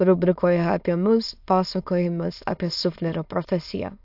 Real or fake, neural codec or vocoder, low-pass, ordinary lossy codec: fake; autoencoder, 22.05 kHz, a latent of 192 numbers a frame, VITS, trained on many speakers; 5.4 kHz; AAC, 48 kbps